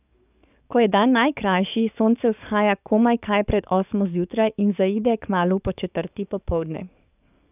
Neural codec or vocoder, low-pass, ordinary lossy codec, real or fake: codec, 44.1 kHz, 7.8 kbps, DAC; 3.6 kHz; none; fake